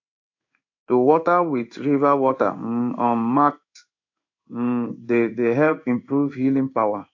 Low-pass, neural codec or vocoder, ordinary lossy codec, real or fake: 7.2 kHz; codec, 16 kHz in and 24 kHz out, 1 kbps, XY-Tokenizer; AAC, 48 kbps; fake